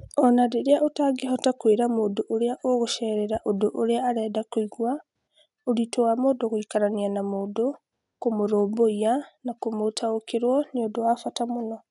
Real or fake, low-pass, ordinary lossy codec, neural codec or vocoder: real; 10.8 kHz; none; none